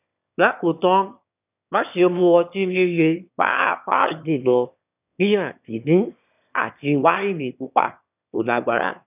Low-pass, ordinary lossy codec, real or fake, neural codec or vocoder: 3.6 kHz; none; fake; autoencoder, 22.05 kHz, a latent of 192 numbers a frame, VITS, trained on one speaker